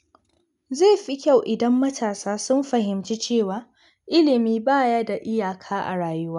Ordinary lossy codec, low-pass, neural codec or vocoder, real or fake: none; 10.8 kHz; none; real